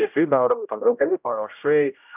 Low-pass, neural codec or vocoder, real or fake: 3.6 kHz; codec, 16 kHz, 0.5 kbps, X-Codec, HuBERT features, trained on general audio; fake